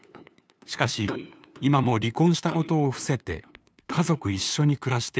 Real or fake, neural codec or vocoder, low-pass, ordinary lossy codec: fake; codec, 16 kHz, 2 kbps, FunCodec, trained on LibriTTS, 25 frames a second; none; none